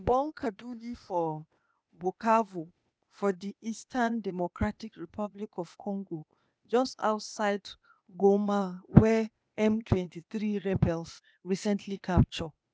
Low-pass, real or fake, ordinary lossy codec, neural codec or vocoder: none; fake; none; codec, 16 kHz, 0.8 kbps, ZipCodec